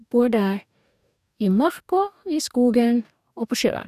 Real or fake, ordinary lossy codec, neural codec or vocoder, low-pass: fake; none; codec, 44.1 kHz, 2.6 kbps, DAC; 14.4 kHz